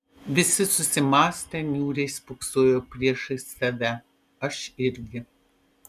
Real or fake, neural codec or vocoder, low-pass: real; none; 14.4 kHz